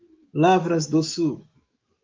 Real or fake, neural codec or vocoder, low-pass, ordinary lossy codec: fake; autoencoder, 48 kHz, 128 numbers a frame, DAC-VAE, trained on Japanese speech; 7.2 kHz; Opus, 24 kbps